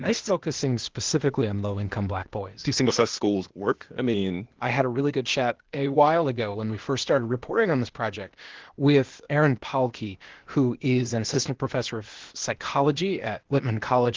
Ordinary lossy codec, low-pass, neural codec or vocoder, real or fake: Opus, 16 kbps; 7.2 kHz; codec, 16 kHz, 0.8 kbps, ZipCodec; fake